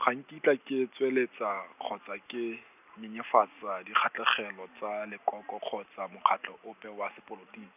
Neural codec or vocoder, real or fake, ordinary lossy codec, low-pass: none; real; none; 3.6 kHz